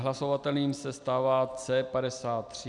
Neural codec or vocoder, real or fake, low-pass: none; real; 10.8 kHz